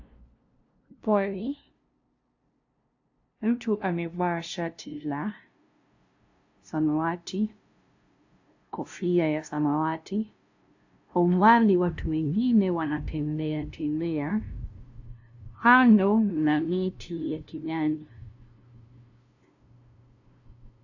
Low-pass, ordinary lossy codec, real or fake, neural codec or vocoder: 7.2 kHz; AAC, 48 kbps; fake; codec, 16 kHz, 0.5 kbps, FunCodec, trained on LibriTTS, 25 frames a second